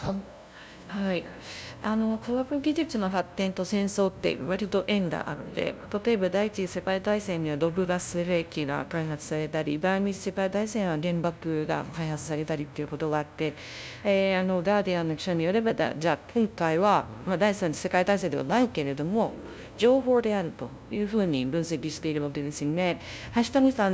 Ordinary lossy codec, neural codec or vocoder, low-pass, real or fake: none; codec, 16 kHz, 0.5 kbps, FunCodec, trained on LibriTTS, 25 frames a second; none; fake